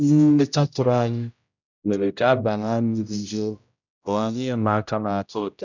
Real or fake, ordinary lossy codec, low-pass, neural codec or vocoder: fake; none; 7.2 kHz; codec, 16 kHz, 0.5 kbps, X-Codec, HuBERT features, trained on general audio